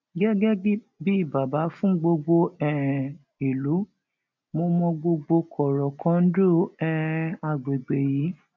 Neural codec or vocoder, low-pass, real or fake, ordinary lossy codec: none; 7.2 kHz; real; none